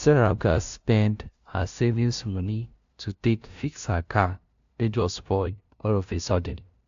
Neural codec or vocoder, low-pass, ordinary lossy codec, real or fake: codec, 16 kHz, 0.5 kbps, FunCodec, trained on LibriTTS, 25 frames a second; 7.2 kHz; AAC, 64 kbps; fake